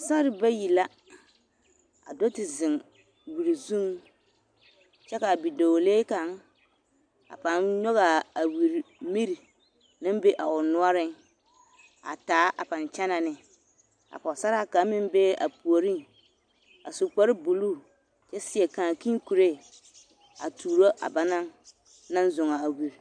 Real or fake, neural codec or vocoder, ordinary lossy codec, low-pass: real; none; AAC, 64 kbps; 9.9 kHz